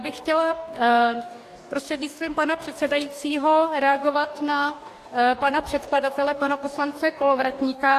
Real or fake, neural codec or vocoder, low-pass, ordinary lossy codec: fake; codec, 44.1 kHz, 2.6 kbps, DAC; 14.4 kHz; AAC, 64 kbps